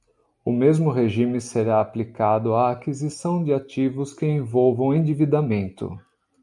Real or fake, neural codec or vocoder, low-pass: real; none; 10.8 kHz